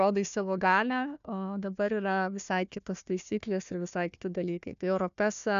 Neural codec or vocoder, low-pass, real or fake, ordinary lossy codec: codec, 16 kHz, 1 kbps, FunCodec, trained on Chinese and English, 50 frames a second; 7.2 kHz; fake; AAC, 96 kbps